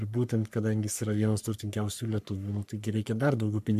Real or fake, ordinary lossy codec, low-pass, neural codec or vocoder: fake; MP3, 96 kbps; 14.4 kHz; codec, 44.1 kHz, 3.4 kbps, Pupu-Codec